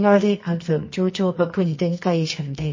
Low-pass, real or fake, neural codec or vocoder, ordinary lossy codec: 7.2 kHz; fake; codec, 24 kHz, 0.9 kbps, WavTokenizer, medium music audio release; MP3, 32 kbps